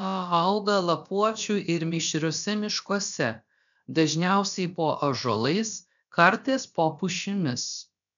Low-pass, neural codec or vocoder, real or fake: 7.2 kHz; codec, 16 kHz, about 1 kbps, DyCAST, with the encoder's durations; fake